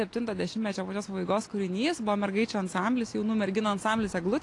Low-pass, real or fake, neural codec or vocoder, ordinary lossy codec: 10.8 kHz; real; none; AAC, 48 kbps